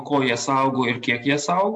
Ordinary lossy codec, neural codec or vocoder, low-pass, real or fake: AAC, 64 kbps; none; 9.9 kHz; real